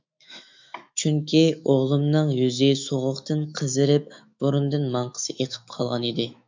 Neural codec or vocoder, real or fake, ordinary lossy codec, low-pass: autoencoder, 48 kHz, 128 numbers a frame, DAC-VAE, trained on Japanese speech; fake; MP3, 64 kbps; 7.2 kHz